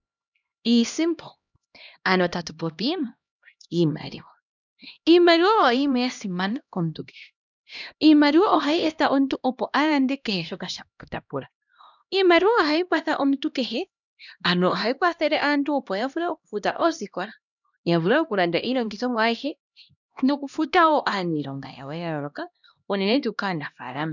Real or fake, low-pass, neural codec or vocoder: fake; 7.2 kHz; codec, 16 kHz, 1 kbps, X-Codec, HuBERT features, trained on LibriSpeech